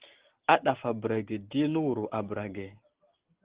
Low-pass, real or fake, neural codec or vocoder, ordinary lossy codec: 3.6 kHz; real; none; Opus, 16 kbps